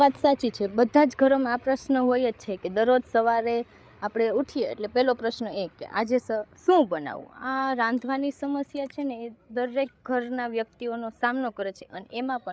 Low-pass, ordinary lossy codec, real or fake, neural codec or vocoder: none; none; fake; codec, 16 kHz, 8 kbps, FreqCodec, larger model